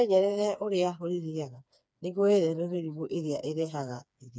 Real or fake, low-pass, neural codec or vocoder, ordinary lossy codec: fake; none; codec, 16 kHz, 4 kbps, FreqCodec, smaller model; none